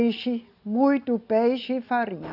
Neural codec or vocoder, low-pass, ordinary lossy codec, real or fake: none; 5.4 kHz; none; real